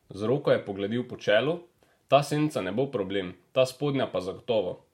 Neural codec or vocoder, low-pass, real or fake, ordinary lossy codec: none; 19.8 kHz; real; MP3, 64 kbps